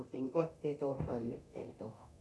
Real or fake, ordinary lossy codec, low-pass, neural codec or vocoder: fake; none; none; codec, 24 kHz, 0.9 kbps, DualCodec